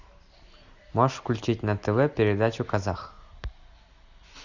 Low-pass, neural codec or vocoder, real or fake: 7.2 kHz; none; real